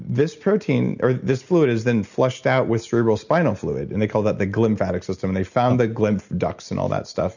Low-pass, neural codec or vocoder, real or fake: 7.2 kHz; none; real